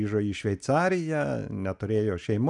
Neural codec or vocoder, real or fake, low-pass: none; real; 10.8 kHz